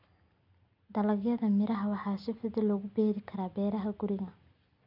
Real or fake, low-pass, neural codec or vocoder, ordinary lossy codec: real; 5.4 kHz; none; none